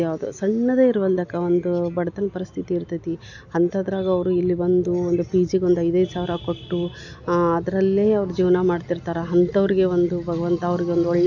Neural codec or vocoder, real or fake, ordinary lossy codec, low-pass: none; real; none; 7.2 kHz